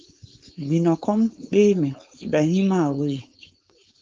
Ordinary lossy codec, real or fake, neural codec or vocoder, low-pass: Opus, 24 kbps; fake; codec, 16 kHz, 4.8 kbps, FACodec; 7.2 kHz